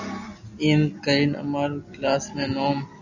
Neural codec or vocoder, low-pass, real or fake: none; 7.2 kHz; real